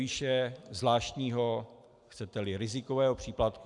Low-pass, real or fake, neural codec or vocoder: 10.8 kHz; real; none